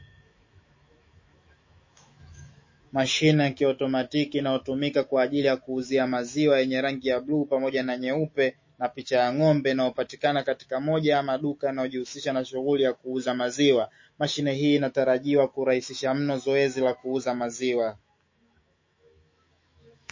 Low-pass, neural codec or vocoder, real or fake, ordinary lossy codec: 7.2 kHz; autoencoder, 48 kHz, 128 numbers a frame, DAC-VAE, trained on Japanese speech; fake; MP3, 32 kbps